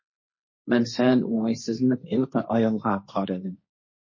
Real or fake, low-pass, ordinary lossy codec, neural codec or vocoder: fake; 7.2 kHz; MP3, 32 kbps; codec, 16 kHz, 1.1 kbps, Voila-Tokenizer